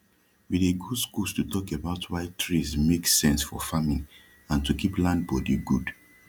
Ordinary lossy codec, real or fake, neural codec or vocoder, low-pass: none; real; none; 19.8 kHz